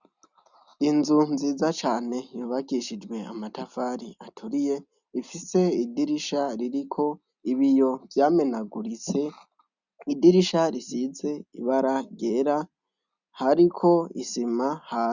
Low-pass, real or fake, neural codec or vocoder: 7.2 kHz; real; none